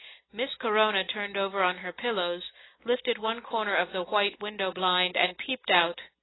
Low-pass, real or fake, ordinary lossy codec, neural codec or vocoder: 7.2 kHz; real; AAC, 16 kbps; none